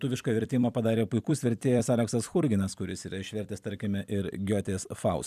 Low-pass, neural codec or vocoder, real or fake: 14.4 kHz; vocoder, 44.1 kHz, 128 mel bands every 256 samples, BigVGAN v2; fake